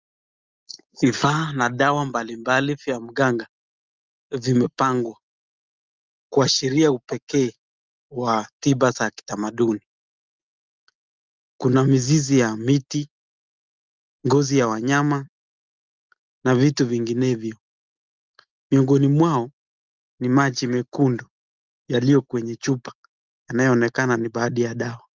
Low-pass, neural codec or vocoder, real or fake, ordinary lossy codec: 7.2 kHz; none; real; Opus, 32 kbps